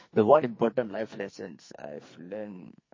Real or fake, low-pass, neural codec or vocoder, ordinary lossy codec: fake; 7.2 kHz; codec, 44.1 kHz, 2.6 kbps, SNAC; MP3, 32 kbps